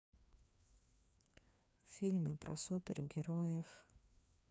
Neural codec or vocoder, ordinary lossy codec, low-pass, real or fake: codec, 16 kHz, 2 kbps, FreqCodec, larger model; none; none; fake